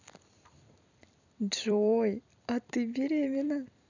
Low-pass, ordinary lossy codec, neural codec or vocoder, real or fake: 7.2 kHz; none; vocoder, 44.1 kHz, 128 mel bands every 256 samples, BigVGAN v2; fake